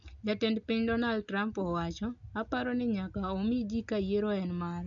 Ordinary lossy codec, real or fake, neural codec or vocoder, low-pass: none; real; none; 7.2 kHz